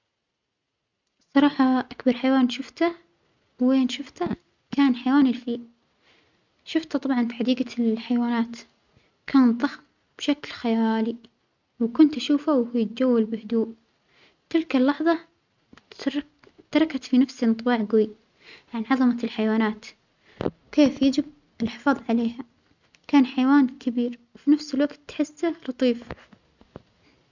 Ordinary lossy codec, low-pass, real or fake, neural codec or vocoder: none; 7.2 kHz; real; none